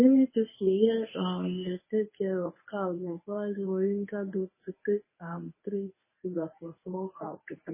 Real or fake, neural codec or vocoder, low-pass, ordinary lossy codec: fake; codec, 24 kHz, 0.9 kbps, WavTokenizer, medium speech release version 1; 3.6 kHz; MP3, 16 kbps